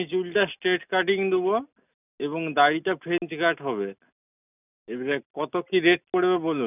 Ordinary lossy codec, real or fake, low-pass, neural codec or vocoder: none; real; 3.6 kHz; none